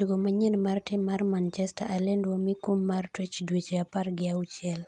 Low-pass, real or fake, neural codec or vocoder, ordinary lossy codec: 10.8 kHz; real; none; Opus, 32 kbps